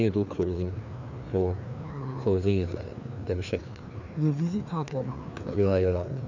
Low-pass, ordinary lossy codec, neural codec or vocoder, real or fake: 7.2 kHz; none; codec, 16 kHz, 2 kbps, FreqCodec, larger model; fake